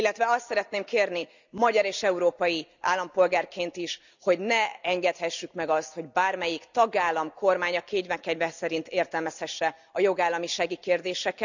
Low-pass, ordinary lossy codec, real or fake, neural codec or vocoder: 7.2 kHz; none; real; none